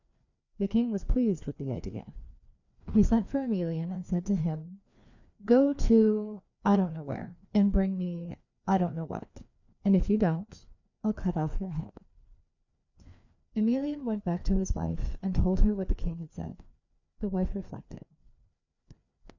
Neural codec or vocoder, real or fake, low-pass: codec, 16 kHz, 2 kbps, FreqCodec, larger model; fake; 7.2 kHz